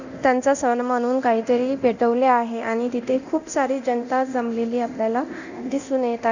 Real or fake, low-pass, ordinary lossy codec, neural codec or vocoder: fake; 7.2 kHz; none; codec, 24 kHz, 0.9 kbps, DualCodec